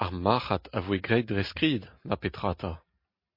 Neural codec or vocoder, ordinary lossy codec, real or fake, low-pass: none; MP3, 32 kbps; real; 5.4 kHz